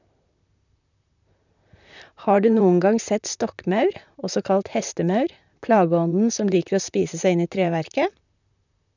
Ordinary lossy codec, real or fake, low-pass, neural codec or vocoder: none; fake; 7.2 kHz; vocoder, 44.1 kHz, 128 mel bands, Pupu-Vocoder